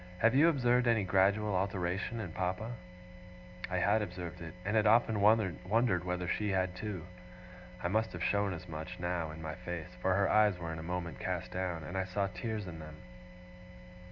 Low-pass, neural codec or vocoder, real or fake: 7.2 kHz; none; real